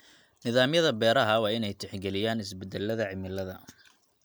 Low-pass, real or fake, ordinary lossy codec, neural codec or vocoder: none; real; none; none